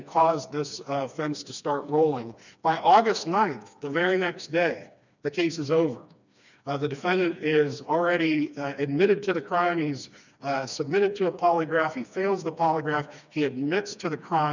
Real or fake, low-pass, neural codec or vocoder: fake; 7.2 kHz; codec, 16 kHz, 2 kbps, FreqCodec, smaller model